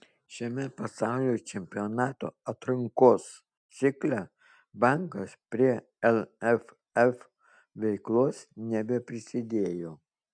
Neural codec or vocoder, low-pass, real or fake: none; 9.9 kHz; real